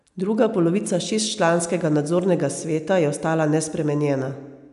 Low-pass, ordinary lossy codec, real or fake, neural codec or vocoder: 10.8 kHz; none; real; none